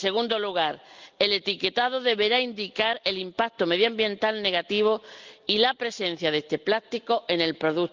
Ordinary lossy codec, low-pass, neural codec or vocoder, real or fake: Opus, 24 kbps; 7.2 kHz; none; real